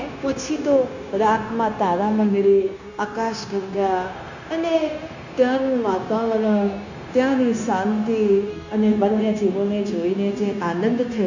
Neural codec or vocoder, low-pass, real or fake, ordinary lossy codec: codec, 16 kHz, 0.9 kbps, LongCat-Audio-Codec; 7.2 kHz; fake; none